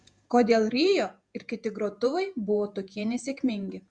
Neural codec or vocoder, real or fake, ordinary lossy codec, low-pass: vocoder, 48 kHz, 128 mel bands, Vocos; fake; Opus, 64 kbps; 9.9 kHz